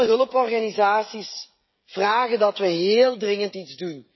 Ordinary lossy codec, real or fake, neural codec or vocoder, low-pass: MP3, 24 kbps; fake; codec, 16 kHz, 16 kbps, FreqCodec, smaller model; 7.2 kHz